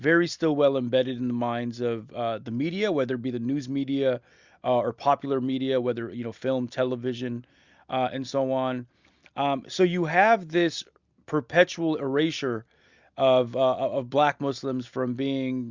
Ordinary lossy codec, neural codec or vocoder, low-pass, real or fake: Opus, 64 kbps; none; 7.2 kHz; real